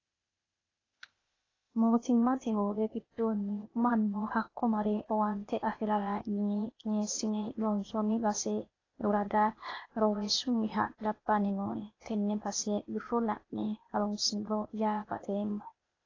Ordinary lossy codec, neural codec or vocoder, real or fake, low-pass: AAC, 32 kbps; codec, 16 kHz, 0.8 kbps, ZipCodec; fake; 7.2 kHz